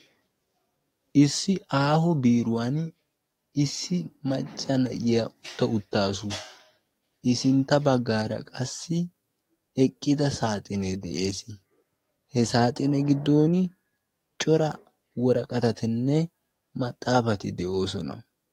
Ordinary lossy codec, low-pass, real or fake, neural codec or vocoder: AAC, 48 kbps; 19.8 kHz; fake; codec, 44.1 kHz, 7.8 kbps, DAC